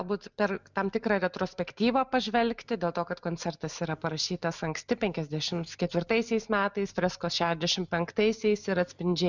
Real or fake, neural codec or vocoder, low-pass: real; none; 7.2 kHz